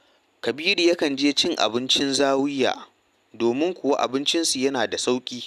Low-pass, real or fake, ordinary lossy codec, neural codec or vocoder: 14.4 kHz; real; none; none